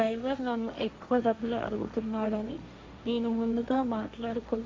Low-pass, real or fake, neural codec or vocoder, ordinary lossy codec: none; fake; codec, 16 kHz, 1.1 kbps, Voila-Tokenizer; none